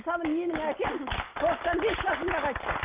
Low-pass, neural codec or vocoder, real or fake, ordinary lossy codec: 3.6 kHz; none; real; Opus, 32 kbps